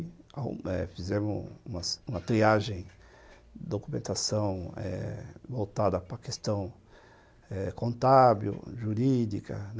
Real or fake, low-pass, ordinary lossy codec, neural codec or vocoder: real; none; none; none